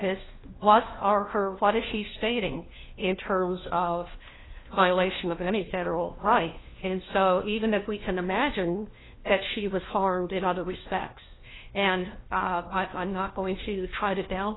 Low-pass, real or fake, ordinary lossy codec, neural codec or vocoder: 7.2 kHz; fake; AAC, 16 kbps; codec, 16 kHz, 1 kbps, FunCodec, trained on LibriTTS, 50 frames a second